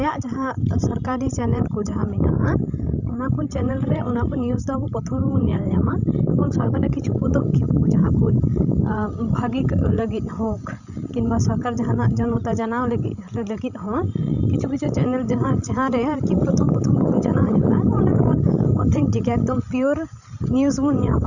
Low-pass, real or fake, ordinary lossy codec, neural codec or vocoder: 7.2 kHz; fake; none; codec, 16 kHz, 16 kbps, FreqCodec, larger model